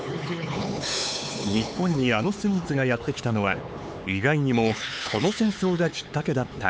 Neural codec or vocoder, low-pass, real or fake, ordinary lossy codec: codec, 16 kHz, 4 kbps, X-Codec, HuBERT features, trained on LibriSpeech; none; fake; none